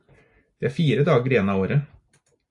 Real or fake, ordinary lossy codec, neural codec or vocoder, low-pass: real; AAC, 64 kbps; none; 10.8 kHz